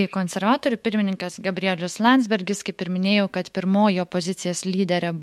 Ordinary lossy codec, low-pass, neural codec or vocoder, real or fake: MP3, 64 kbps; 19.8 kHz; autoencoder, 48 kHz, 128 numbers a frame, DAC-VAE, trained on Japanese speech; fake